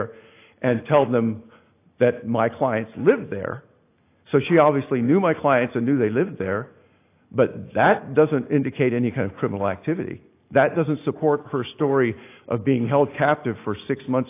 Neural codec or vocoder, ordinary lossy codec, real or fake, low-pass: none; AAC, 24 kbps; real; 3.6 kHz